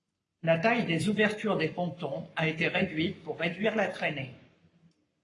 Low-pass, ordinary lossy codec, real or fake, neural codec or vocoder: 10.8 kHz; AAC, 32 kbps; fake; codec, 44.1 kHz, 7.8 kbps, Pupu-Codec